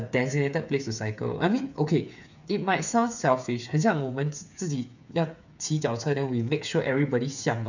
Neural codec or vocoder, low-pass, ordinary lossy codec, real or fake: codec, 16 kHz, 8 kbps, FreqCodec, smaller model; 7.2 kHz; none; fake